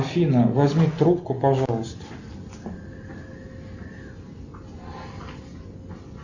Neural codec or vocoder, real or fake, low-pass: none; real; 7.2 kHz